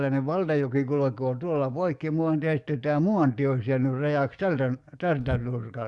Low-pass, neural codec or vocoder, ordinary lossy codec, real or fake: 10.8 kHz; codec, 44.1 kHz, 7.8 kbps, DAC; Opus, 64 kbps; fake